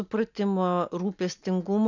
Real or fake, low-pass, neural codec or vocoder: real; 7.2 kHz; none